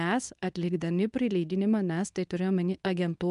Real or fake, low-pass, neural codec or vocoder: fake; 10.8 kHz; codec, 24 kHz, 0.9 kbps, WavTokenizer, medium speech release version 2